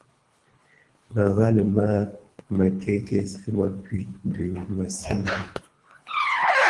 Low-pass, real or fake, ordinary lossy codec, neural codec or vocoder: 10.8 kHz; fake; Opus, 24 kbps; codec, 24 kHz, 3 kbps, HILCodec